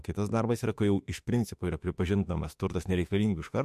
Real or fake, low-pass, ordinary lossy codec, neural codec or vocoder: fake; 14.4 kHz; MP3, 64 kbps; autoencoder, 48 kHz, 32 numbers a frame, DAC-VAE, trained on Japanese speech